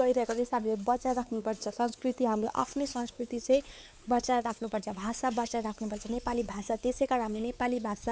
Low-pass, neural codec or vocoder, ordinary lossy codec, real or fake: none; codec, 16 kHz, 4 kbps, X-Codec, WavLM features, trained on Multilingual LibriSpeech; none; fake